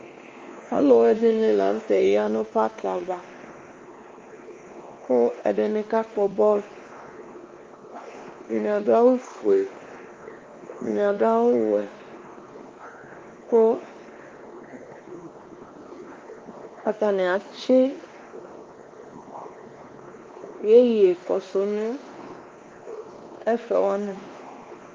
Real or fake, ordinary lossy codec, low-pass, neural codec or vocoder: fake; Opus, 32 kbps; 7.2 kHz; codec, 16 kHz, 2 kbps, X-Codec, WavLM features, trained on Multilingual LibriSpeech